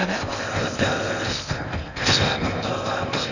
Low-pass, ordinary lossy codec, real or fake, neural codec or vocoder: 7.2 kHz; none; fake; codec, 16 kHz in and 24 kHz out, 0.6 kbps, FocalCodec, streaming, 4096 codes